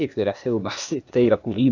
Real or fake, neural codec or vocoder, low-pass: fake; codec, 16 kHz, 0.8 kbps, ZipCodec; 7.2 kHz